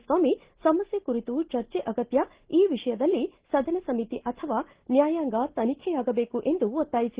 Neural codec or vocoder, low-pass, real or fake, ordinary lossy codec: none; 3.6 kHz; real; Opus, 16 kbps